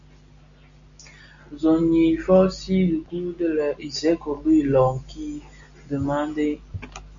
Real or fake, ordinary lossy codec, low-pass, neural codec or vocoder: real; AAC, 64 kbps; 7.2 kHz; none